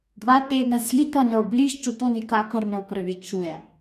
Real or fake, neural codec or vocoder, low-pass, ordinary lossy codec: fake; codec, 44.1 kHz, 2.6 kbps, DAC; 14.4 kHz; none